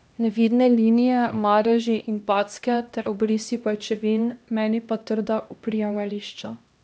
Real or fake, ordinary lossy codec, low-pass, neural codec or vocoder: fake; none; none; codec, 16 kHz, 1 kbps, X-Codec, HuBERT features, trained on LibriSpeech